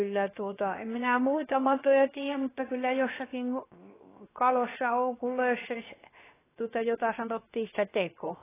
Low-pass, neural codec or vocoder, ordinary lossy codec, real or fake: 3.6 kHz; codec, 16 kHz, 0.7 kbps, FocalCodec; AAC, 16 kbps; fake